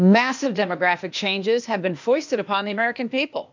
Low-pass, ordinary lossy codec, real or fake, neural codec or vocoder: 7.2 kHz; MP3, 64 kbps; fake; codec, 16 kHz, 0.8 kbps, ZipCodec